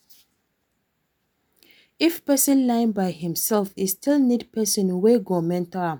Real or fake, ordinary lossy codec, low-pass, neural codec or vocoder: real; none; none; none